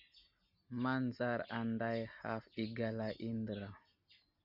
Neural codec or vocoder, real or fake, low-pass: none; real; 5.4 kHz